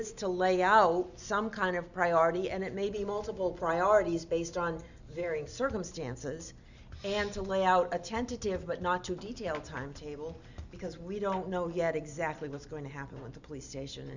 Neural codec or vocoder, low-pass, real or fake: none; 7.2 kHz; real